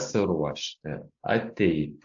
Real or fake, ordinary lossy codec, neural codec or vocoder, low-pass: real; AAC, 64 kbps; none; 7.2 kHz